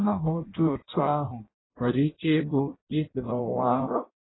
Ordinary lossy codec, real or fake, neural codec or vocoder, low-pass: AAC, 16 kbps; fake; codec, 16 kHz in and 24 kHz out, 0.6 kbps, FireRedTTS-2 codec; 7.2 kHz